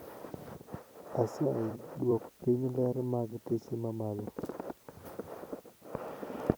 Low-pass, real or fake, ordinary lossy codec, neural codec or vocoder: none; fake; none; vocoder, 44.1 kHz, 128 mel bands every 256 samples, BigVGAN v2